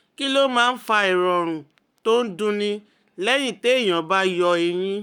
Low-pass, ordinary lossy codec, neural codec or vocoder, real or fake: 19.8 kHz; none; codec, 44.1 kHz, 7.8 kbps, Pupu-Codec; fake